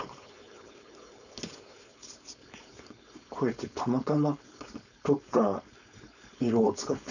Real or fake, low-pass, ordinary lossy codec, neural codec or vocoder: fake; 7.2 kHz; none; codec, 16 kHz, 4.8 kbps, FACodec